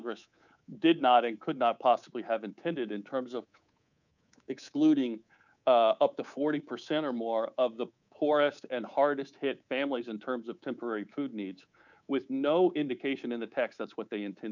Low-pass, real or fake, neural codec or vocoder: 7.2 kHz; fake; codec, 24 kHz, 3.1 kbps, DualCodec